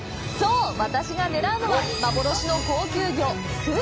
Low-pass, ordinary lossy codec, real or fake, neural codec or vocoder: none; none; real; none